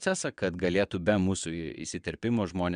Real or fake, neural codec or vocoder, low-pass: fake; vocoder, 22.05 kHz, 80 mel bands, WaveNeXt; 9.9 kHz